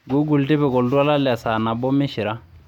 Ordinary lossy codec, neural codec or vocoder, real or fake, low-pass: MP3, 96 kbps; none; real; 19.8 kHz